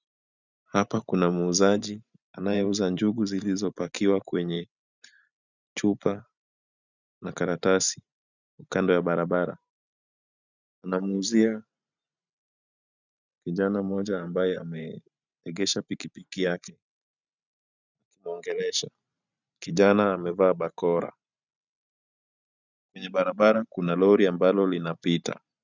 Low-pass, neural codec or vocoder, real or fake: 7.2 kHz; vocoder, 44.1 kHz, 128 mel bands every 512 samples, BigVGAN v2; fake